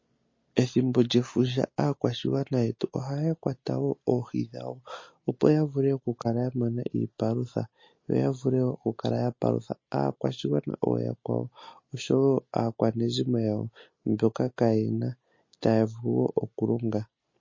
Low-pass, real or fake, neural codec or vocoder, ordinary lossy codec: 7.2 kHz; real; none; MP3, 32 kbps